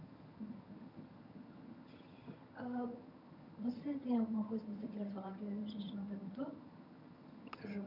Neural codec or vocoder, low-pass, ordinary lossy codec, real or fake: vocoder, 22.05 kHz, 80 mel bands, HiFi-GAN; 5.4 kHz; none; fake